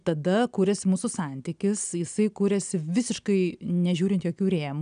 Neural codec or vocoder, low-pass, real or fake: none; 9.9 kHz; real